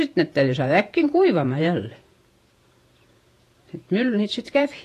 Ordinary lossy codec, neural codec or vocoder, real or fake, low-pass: AAC, 48 kbps; vocoder, 48 kHz, 128 mel bands, Vocos; fake; 14.4 kHz